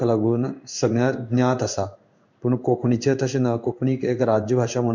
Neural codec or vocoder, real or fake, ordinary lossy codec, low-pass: codec, 16 kHz in and 24 kHz out, 1 kbps, XY-Tokenizer; fake; MP3, 64 kbps; 7.2 kHz